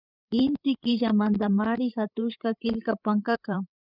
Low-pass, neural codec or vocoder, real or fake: 5.4 kHz; codec, 16 kHz, 8 kbps, FreqCodec, larger model; fake